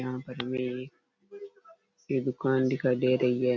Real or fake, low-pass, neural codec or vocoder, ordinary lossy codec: real; 7.2 kHz; none; none